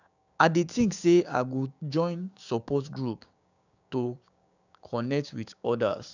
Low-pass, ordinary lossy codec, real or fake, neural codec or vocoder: 7.2 kHz; none; fake; codec, 16 kHz, 6 kbps, DAC